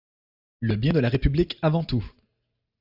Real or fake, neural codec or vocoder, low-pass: real; none; 5.4 kHz